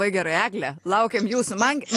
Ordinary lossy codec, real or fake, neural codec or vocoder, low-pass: AAC, 48 kbps; real; none; 14.4 kHz